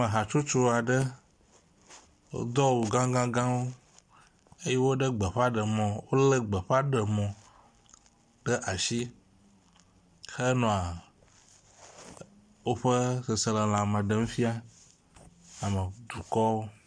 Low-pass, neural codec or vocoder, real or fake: 9.9 kHz; none; real